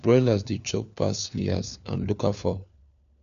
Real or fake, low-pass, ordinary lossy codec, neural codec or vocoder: fake; 7.2 kHz; none; codec, 16 kHz, 4 kbps, FunCodec, trained on LibriTTS, 50 frames a second